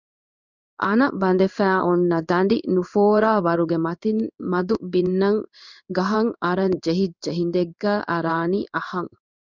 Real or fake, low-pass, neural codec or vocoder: fake; 7.2 kHz; codec, 16 kHz in and 24 kHz out, 1 kbps, XY-Tokenizer